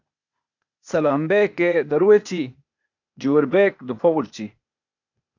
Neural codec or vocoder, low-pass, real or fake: codec, 16 kHz, 0.8 kbps, ZipCodec; 7.2 kHz; fake